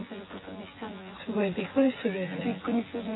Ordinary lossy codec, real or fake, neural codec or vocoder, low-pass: AAC, 16 kbps; fake; vocoder, 24 kHz, 100 mel bands, Vocos; 7.2 kHz